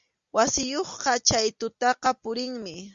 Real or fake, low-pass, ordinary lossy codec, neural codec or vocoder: real; 7.2 kHz; Opus, 64 kbps; none